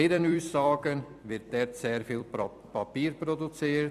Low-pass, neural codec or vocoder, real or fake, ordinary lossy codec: 14.4 kHz; vocoder, 44.1 kHz, 128 mel bands every 256 samples, BigVGAN v2; fake; none